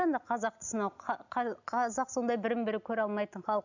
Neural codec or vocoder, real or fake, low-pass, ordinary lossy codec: none; real; 7.2 kHz; none